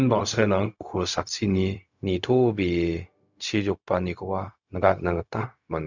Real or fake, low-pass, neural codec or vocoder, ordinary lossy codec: fake; 7.2 kHz; codec, 16 kHz, 0.4 kbps, LongCat-Audio-Codec; none